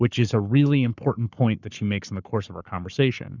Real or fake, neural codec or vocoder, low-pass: fake; codec, 44.1 kHz, 7.8 kbps, Pupu-Codec; 7.2 kHz